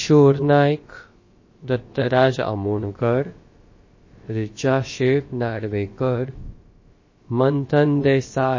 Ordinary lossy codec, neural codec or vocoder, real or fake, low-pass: MP3, 32 kbps; codec, 16 kHz, about 1 kbps, DyCAST, with the encoder's durations; fake; 7.2 kHz